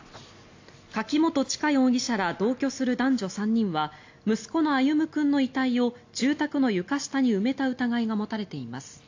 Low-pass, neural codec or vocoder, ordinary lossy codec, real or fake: 7.2 kHz; none; AAC, 48 kbps; real